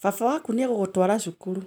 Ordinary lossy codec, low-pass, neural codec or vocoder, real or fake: none; none; none; real